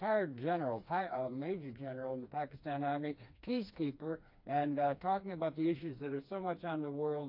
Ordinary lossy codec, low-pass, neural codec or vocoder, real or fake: AAC, 48 kbps; 5.4 kHz; codec, 16 kHz, 2 kbps, FreqCodec, smaller model; fake